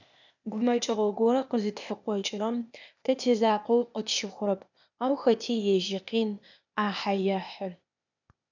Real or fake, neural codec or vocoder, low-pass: fake; codec, 16 kHz, 0.8 kbps, ZipCodec; 7.2 kHz